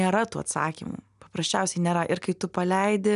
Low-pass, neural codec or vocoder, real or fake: 10.8 kHz; none; real